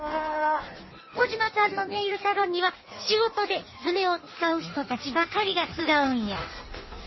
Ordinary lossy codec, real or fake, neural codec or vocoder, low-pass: MP3, 24 kbps; fake; codec, 16 kHz in and 24 kHz out, 1.1 kbps, FireRedTTS-2 codec; 7.2 kHz